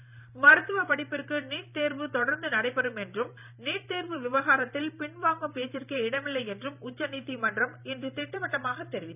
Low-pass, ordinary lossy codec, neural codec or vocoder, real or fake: 3.6 kHz; none; vocoder, 44.1 kHz, 128 mel bands every 512 samples, BigVGAN v2; fake